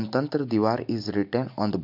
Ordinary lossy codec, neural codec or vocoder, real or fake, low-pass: MP3, 48 kbps; none; real; 5.4 kHz